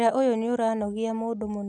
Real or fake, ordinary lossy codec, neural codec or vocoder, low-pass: real; none; none; none